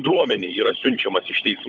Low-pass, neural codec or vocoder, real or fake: 7.2 kHz; codec, 16 kHz, 16 kbps, FunCodec, trained on LibriTTS, 50 frames a second; fake